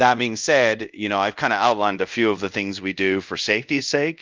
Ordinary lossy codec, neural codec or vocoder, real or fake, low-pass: Opus, 24 kbps; codec, 24 kHz, 0.5 kbps, DualCodec; fake; 7.2 kHz